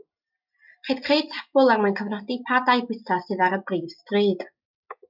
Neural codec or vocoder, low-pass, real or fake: none; 5.4 kHz; real